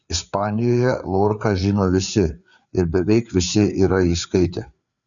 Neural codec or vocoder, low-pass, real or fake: codec, 16 kHz, 4 kbps, FreqCodec, larger model; 7.2 kHz; fake